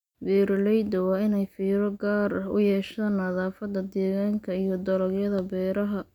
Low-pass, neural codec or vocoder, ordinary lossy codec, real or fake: 19.8 kHz; none; none; real